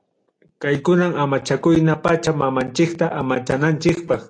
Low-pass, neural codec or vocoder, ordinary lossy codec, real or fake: 9.9 kHz; none; AAC, 48 kbps; real